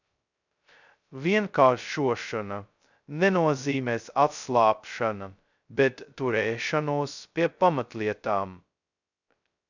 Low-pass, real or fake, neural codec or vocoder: 7.2 kHz; fake; codec, 16 kHz, 0.2 kbps, FocalCodec